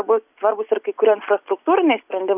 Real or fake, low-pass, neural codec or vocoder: real; 3.6 kHz; none